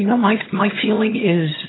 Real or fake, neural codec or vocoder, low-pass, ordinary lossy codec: fake; vocoder, 22.05 kHz, 80 mel bands, HiFi-GAN; 7.2 kHz; AAC, 16 kbps